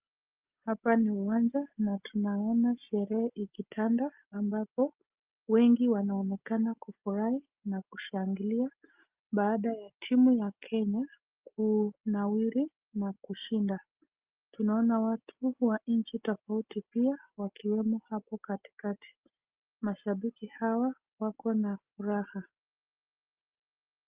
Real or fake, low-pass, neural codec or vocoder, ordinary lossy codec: real; 3.6 kHz; none; Opus, 24 kbps